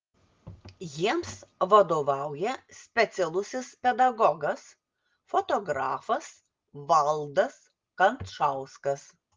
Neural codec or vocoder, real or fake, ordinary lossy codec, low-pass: none; real; Opus, 32 kbps; 7.2 kHz